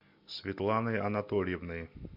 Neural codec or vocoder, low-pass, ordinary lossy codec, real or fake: none; 5.4 kHz; MP3, 48 kbps; real